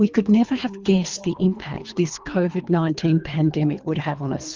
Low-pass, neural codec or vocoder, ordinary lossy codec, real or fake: 7.2 kHz; codec, 24 kHz, 3 kbps, HILCodec; Opus, 32 kbps; fake